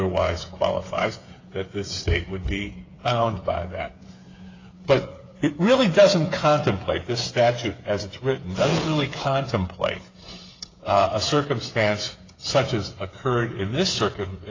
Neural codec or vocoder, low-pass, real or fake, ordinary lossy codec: codec, 16 kHz, 8 kbps, FreqCodec, smaller model; 7.2 kHz; fake; AAC, 32 kbps